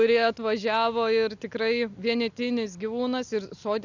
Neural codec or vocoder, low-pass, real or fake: none; 7.2 kHz; real